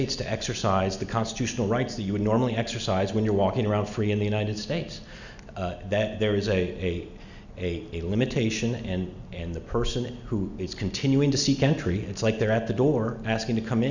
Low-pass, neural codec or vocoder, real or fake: 7.2 kHz; none; real